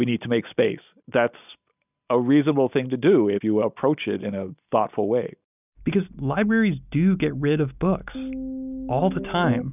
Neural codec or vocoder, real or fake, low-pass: none; real; 3.6 kHz